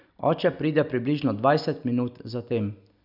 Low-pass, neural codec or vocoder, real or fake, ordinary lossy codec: 5.4 kHz; none; real; none